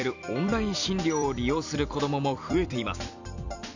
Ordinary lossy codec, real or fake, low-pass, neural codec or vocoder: none; fake; 7.2 kHz; vocoder, 44.1 kHz, 128 mel bands every 256 samples, BigVGAN v2